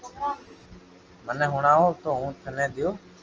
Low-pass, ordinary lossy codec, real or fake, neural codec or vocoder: 7.2 kHz; Opus, 24 kbps; real; none